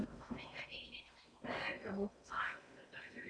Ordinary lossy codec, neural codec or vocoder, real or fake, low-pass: MP3, 96 kbps; codec, 16 kHz in and 24 kHz out, 0.6 kbps, FocalCodec, streaming, 4096 codes; fake; 9.9 kHz